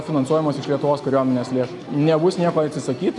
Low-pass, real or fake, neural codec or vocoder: 10.8 kHz; real; none